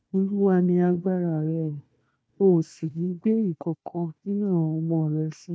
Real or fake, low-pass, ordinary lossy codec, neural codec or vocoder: fake; none; none; codec, 16 kHz, 1 kbps, FunCodec, trained on Chinese and English, 50 frames a second